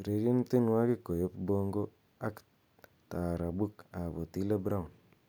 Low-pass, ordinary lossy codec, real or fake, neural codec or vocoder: none; none; real; none